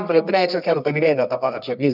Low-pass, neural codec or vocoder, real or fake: 5.4 kHz; codec, 24 kHz, 0.9 kbps, WavTokenizer, medium music audio release; fake